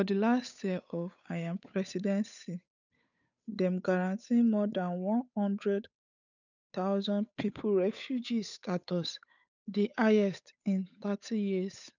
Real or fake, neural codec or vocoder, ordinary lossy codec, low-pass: fake; codec, 16 kHz, 8 kbps, FunCodec, trained on Chinese and English, 25 frames a second; none; 7.2 kHz